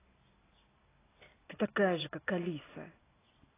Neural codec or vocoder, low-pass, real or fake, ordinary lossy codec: none; 3.6 kHz; real; AAC, 16 kbps